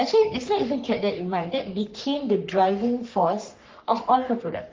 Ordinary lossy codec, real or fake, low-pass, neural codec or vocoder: Opus, 24 kbps; fake; 7.2 kHz; codec, 44.1 kHz, 3.4 kbps, Pupu-Codec